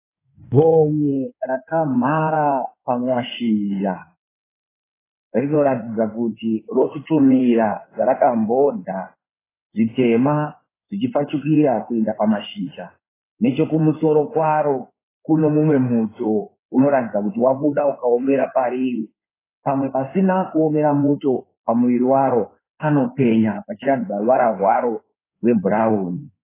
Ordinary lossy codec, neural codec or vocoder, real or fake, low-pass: AAC, 16 kbps; codec, 16 kHz in and 24 kHz out, 2.2 kbps, FireRedTTS-2 codec; fake; 3.6 kHz